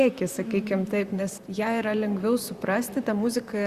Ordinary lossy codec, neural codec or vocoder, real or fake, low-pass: Opus, 64 kbps; vocoder, 44.1 kHz, 128 mel bands, Pupu-Vocoder; fake; 14.4 kHz